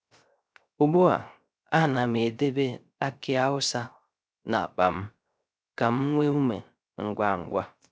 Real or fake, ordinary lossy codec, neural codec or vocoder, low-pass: fake; none; codec, 16 kHz, 0.3 kbps, FocalCodec; none